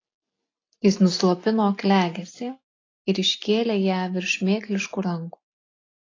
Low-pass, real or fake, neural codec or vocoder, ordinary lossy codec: 7.2 kHz; real; none; AAC, 32 kbps